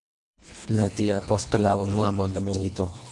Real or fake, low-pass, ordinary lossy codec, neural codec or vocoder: fake; 10.8 kHz; MP3, 64 kbps; codec, 24 kHz, 1.5 kbps, HILCodec